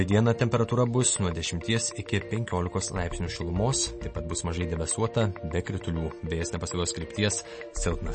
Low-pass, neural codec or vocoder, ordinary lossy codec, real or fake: 10.8 kHz; none; MP3, 32 kbps; real